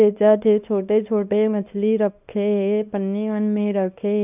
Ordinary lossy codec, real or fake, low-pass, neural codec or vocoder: none; fake; 3.6 kHz; codec, 24 kHz, 0.9 kbps, WavTokenizer, small release